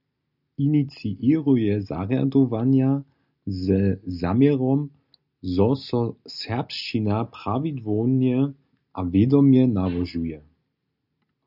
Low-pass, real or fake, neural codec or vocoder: 5.4 kHz; real; none